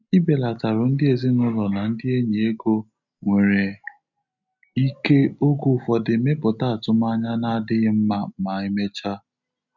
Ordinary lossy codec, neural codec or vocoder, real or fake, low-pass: none; none; real; 7.2 kHz